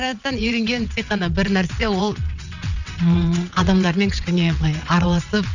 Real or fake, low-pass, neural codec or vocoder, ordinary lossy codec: fake; 7.2 kHz; vocoder, 22.05 kHz, 80 mel bands, WaveNeXt; none